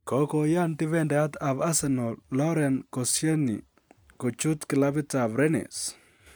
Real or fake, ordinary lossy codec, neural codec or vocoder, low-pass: real; none; none; none